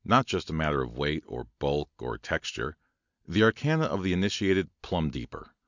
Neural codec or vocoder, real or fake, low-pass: none; real; 7.2 kHz